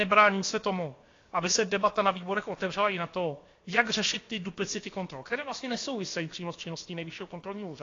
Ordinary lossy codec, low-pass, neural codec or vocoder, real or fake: AAC, 32 kbps; 7.2 kHz; codec, 16 kHz, about 1 kbps, DyCAST, with the encoder's durations; fake